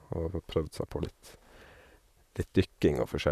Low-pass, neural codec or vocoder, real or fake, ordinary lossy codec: 14.4 kHz; vocoder, 44.1 kHz, 128 mel bands, Pupu-Vocoder; fake; none